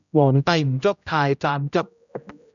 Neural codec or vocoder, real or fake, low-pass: codec, 16 kHz, 0.5 kbps, X-Codec, HuBERT features, trained on general audio; fake; 7.2 kHz